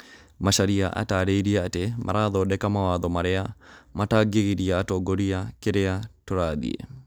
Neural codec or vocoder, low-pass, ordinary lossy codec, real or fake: none; none; none; real